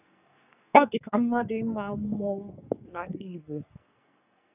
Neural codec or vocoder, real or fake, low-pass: codec, 44.1 kHz, 2.6 kbps, SNAC; fake; 3.6 kHz